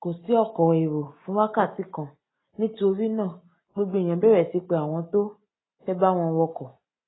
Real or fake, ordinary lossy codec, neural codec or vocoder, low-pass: real; AAC, 16 kbps; none; 7.2 kHz